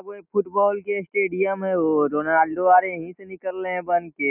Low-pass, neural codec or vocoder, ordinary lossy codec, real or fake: 3.6 kHz; none; none; real